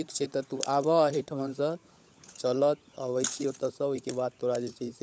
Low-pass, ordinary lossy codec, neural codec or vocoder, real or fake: none; none; codec, 16 kHz, 16 kbps, FunCodec, trained on LibriTTS, 50 frames a second; fake